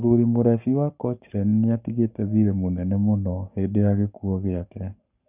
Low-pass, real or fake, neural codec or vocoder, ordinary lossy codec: 3.6 kHz; fake; codec, 44.1 kHz, 7.8 kbps, Pupu-Codec; none